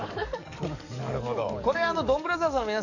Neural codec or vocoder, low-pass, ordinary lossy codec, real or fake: codec, 44.1 kHz, 7.8 kbps, DAC; 7.2 kHz; none; fake